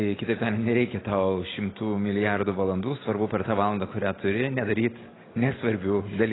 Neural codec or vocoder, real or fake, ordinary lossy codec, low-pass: none; real; AAC, 16 kbps; 7.2 kHz